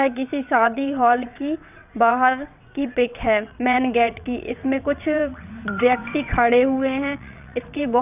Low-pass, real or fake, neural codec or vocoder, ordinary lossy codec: 3.6 kHz; fake; vocoder, 22.05 kHz, 80 mel bands, WaveNeXt; none